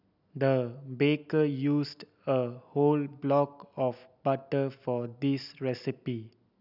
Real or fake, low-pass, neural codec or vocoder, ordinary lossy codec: real; 5.4 kHz; none; none